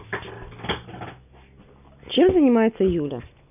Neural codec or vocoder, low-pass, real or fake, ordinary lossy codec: none; 3.6 kHz; real; none